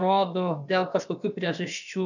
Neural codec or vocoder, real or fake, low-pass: codec, 16 kHz, about 1 kbps, DyCAST, with the encoder's durations; fake; 7.2 kHz